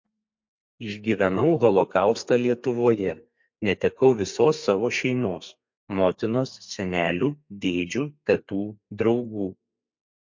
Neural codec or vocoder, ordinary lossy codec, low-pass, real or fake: codec, 32 kHz, 1.9 kbps, SNAC; MP3, 48 kbps; 7.2 kHz; fake